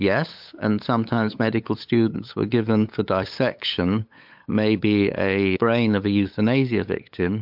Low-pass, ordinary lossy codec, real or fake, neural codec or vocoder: 5.4 kHz; MP3, 48 kbps; fake; codec, 16 kHz, 8 kbps, FunCodec, trained on LibriTTS, 25 frames a second